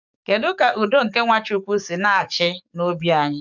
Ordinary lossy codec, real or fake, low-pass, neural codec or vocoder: none; fake; 7.2 kHz; codec, 44.1 kHz, 7.8 kbps, DAC